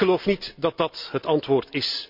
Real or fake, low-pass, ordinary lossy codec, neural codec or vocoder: real; 5.4 kHz; none; none